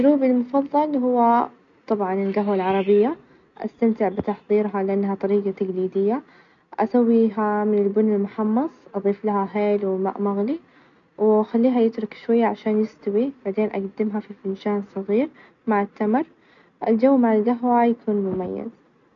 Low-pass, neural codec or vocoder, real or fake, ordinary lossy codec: 7.2 kHz; none; real; none